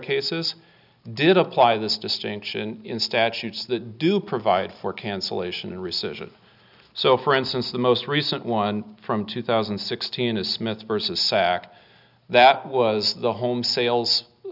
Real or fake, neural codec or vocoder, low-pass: real; none; 5.4 kHz